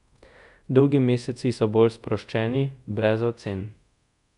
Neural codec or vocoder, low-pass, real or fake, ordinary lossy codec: codec, 24 kHz, 0.5 kbps, DualCodec; 10.8 kHz; fake; none